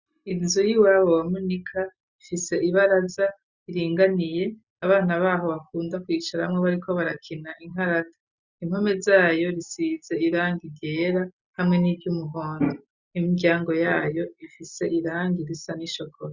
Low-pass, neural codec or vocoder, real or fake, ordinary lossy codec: 7.2 kHz; none; real; Opus, 64 kbps